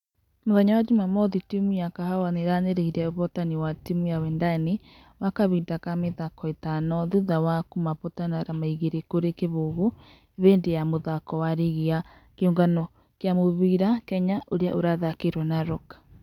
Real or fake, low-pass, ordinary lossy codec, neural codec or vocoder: real; 19.8 kHz; none; none